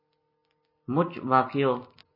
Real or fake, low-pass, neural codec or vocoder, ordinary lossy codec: real; 5.4 kHz; none; MP3, 24 kbps